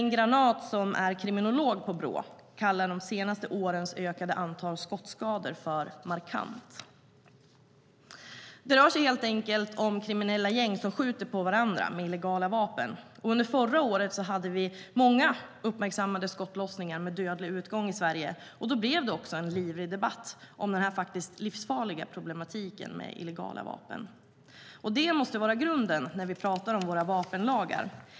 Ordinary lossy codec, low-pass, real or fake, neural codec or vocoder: none; none; real; none